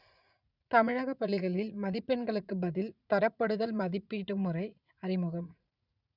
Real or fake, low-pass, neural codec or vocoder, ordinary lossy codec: fake; 5.4 kHz; vocoder, 44.1 kHz, 80 mel bands, Vocos; none